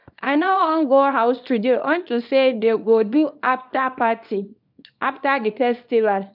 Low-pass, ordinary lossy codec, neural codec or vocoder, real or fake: 5.4 kHz; none; codec, 24 kHz, 0.9 kbps, WavTokenizer, small release; fake